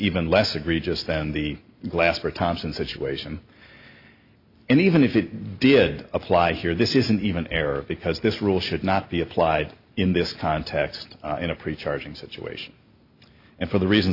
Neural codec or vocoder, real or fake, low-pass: none; real; 5.4 kHz